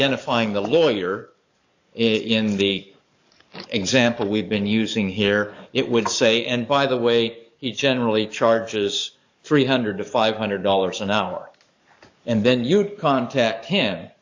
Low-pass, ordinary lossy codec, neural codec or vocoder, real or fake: 7.2 kHz; AAC, 48 kbps; codec, 44.1 kHz, 7.8 kbps, DAC; fake